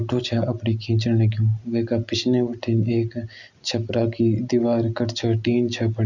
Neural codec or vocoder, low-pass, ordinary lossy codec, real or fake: none; 7.2 kHz; none; real